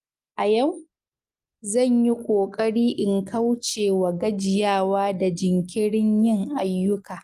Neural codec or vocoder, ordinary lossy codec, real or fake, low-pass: none; Opus, 32 kbps; real; 14.4 kHz